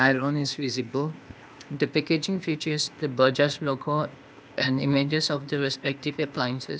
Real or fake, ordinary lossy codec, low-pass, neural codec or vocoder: fake; none; none; codec, 16 kHz, 0.8 kbps, ZipCodec